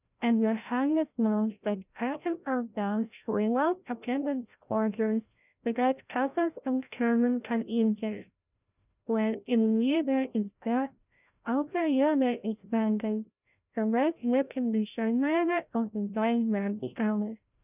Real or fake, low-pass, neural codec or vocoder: fake; 3.6 kHz; codec, 16 kHz, 0.5 kbps, FreqCodec, larger model